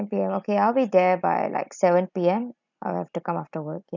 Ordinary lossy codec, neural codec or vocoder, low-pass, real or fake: none; none; 7.2 kHz; real